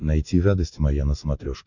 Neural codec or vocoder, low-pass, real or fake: none; 7.2 kHz; real